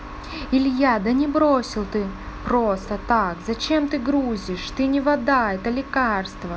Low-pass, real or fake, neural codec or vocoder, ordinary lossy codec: none; real; none; none